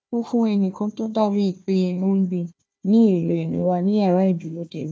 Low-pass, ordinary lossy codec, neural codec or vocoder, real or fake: none; none; codec, 16 kHz, 1 kbps, FunCodec, trained on Chinese and English, 50 frames a second; fake